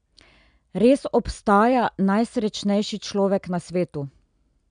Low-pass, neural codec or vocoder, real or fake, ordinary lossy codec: 9.9 kHz; none; real; none